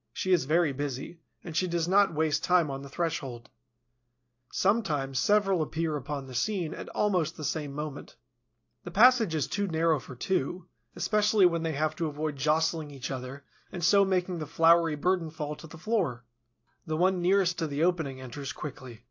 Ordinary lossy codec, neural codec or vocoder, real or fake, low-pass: AAC, 48 kbps; none; real; 7.2 kHz